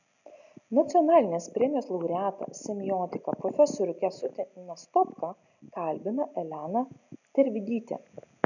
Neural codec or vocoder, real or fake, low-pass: none; real; 7.2 kHz